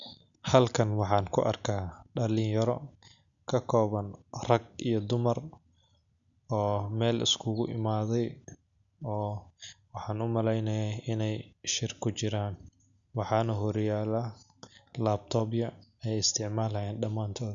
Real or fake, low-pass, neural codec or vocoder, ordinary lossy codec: real; 7.2 kHz; none; none